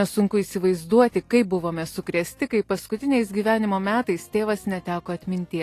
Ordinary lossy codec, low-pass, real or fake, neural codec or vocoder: AAC, 48 kbps; 14.4 kHz; real; none